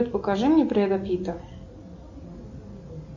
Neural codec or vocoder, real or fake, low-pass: none; real; 7.2 kHz